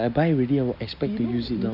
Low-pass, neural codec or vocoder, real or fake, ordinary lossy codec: 5.4 kHz; none; real; none